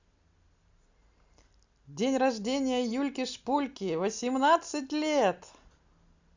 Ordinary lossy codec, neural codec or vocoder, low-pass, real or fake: Opus, 64 kbps; none; 7.2 kHz; real